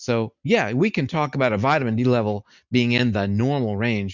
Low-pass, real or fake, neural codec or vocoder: 7.2 kHz; real; none